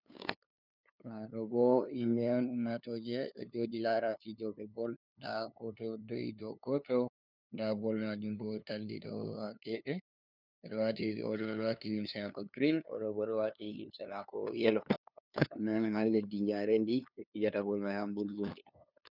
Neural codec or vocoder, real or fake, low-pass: codec, 16 kHz, 2 kbps, FunCodec, trained on LibriTTS, 25 frames a second; fake; 5.4 kHz